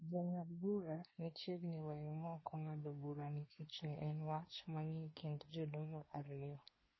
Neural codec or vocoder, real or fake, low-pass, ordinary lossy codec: codec, 24 kHz, 1 kbps, SNAC; fake; 7.2 kHz; MP3, 24 kbps